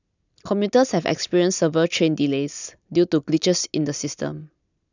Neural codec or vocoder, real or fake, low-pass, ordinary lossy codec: none; real; 7.2 kHz; none